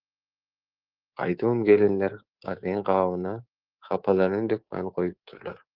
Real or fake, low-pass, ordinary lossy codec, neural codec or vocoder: fake; 5.4 kHz; Opus, 16 kbps; codec, 24 kHz, 3.1 kbps, DualCodec